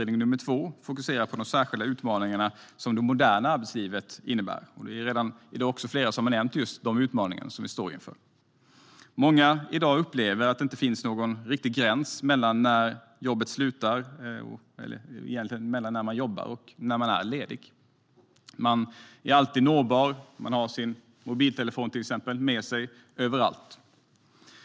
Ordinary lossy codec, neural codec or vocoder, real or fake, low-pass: none; none; real; none